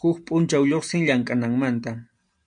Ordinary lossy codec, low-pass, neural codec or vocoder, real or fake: MP3, 64 kbps; 9.9 kHz; none; real